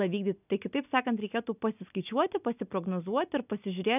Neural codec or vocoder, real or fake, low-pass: none; real; 3.6 kHz